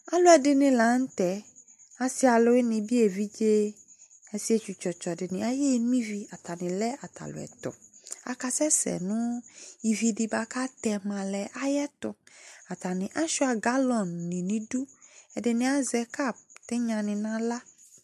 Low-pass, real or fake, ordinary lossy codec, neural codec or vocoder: 14.4 kHz; real; MP3, 64 kbps; none